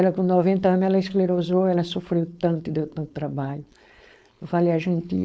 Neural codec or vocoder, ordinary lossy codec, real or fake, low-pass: codec, 16 kHz, 4.8 kbps, FACodec; none; fake; none